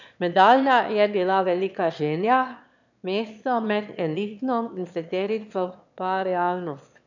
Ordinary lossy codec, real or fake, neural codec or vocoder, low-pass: none; fake; autoencoder, 22.05 kHz, a latent of 192 numbers a frame, VITS, trained on one speaker; 7.2 kHz